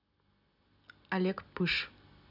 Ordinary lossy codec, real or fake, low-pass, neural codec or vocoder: none; real; 5.4 kHz; none